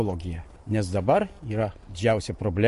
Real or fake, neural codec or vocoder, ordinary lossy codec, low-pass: real; none; MP3, 48 kbps; 14.4 kHz